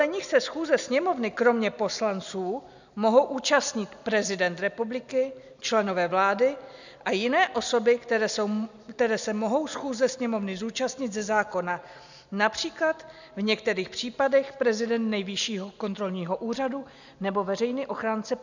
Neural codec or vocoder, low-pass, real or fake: none; 7.2 kHz; real